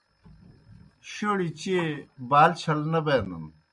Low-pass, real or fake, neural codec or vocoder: 10.8 kHz; real; none